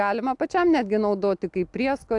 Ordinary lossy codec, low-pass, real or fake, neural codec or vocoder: AAC, 64 kbps; 10.8 kHz; real; none